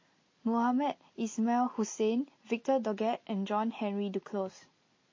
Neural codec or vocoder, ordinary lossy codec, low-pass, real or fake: none; MP3, 32 kbps; 7.2 kHz; real